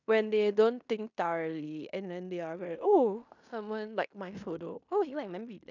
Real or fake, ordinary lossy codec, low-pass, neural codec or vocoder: fake; none; 7.2 kHz; codec, 16 kHz in and 24 kHz out, 0.9 kbps, LongCat-Audio-Codec, fine tuned four codebook decoder